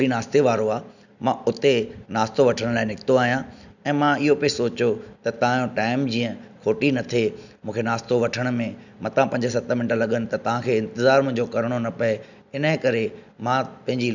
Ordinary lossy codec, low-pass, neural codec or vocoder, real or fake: none; 7.2 kHz; none; real